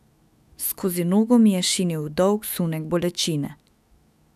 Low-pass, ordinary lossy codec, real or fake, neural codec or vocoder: 14.4 kHz; none; fake; autoencoder, 48 kHz, 128 numbers a frame, DAC-VAE, trained on Japanese speech